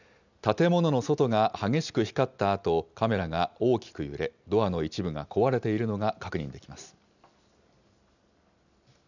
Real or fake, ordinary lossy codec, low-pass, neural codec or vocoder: real; none; 7.2 kHz; none